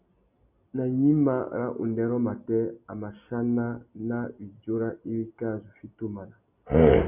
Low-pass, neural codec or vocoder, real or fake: 3.6 kHz; none; real